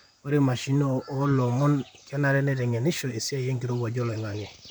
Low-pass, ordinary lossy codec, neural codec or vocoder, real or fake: none; none; vocoder, 44.1 kHz, 128 mel bands, Pupu-Vocoder; fake